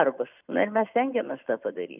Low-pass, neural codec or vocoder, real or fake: 3.6 kHz; none; real